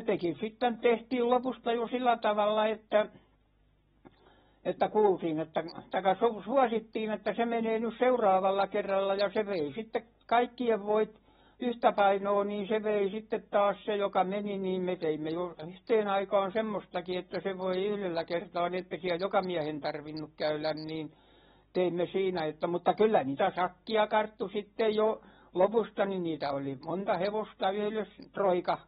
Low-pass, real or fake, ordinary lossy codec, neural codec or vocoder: 19.8 kHz; real; AAC, 16 kbps; none